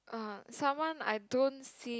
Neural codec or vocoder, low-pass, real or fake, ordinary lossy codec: none; none; real; none